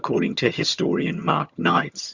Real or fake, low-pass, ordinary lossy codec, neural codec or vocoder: fake; 7.2 kHz; Opus, 64 kbps; vocoder, 22.05 kHz, 80 mel bands, HiFi-GAN